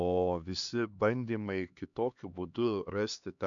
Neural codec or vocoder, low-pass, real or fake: codec, 16 kHz, 2 kbps, X-Codec, HuBERT features, trained on LibriSpeech; 7.2 kHz; fake